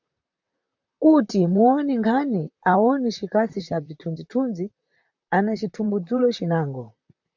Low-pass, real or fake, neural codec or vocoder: 7.2 kHz; fake; vocoder, 44.1 kHz, 128 mel bands, Pupu-Vocoder